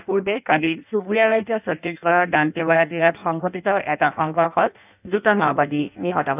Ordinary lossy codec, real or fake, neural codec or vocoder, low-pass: none; fake; codec, 16 kHz in and 24 kHz out, 0.6 kbps, FireRedTTS-2 codec; 3.6 kHz